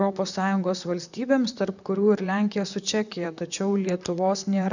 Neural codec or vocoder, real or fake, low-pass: vocoder, 44.1 kHz, 128 mel bands, Pupu-Vocoder; fake; 7.2 kHz